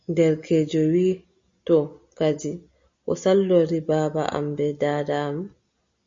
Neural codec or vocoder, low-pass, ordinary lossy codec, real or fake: none; 7.2 kHz; MP3, 48 kbps; real